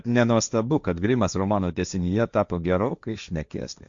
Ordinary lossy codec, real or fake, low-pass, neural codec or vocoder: Opus, 64 kbps; fake; 7.2 kHz; codec, 16 kHz, 1.1 kbps, Voila-Tokenizer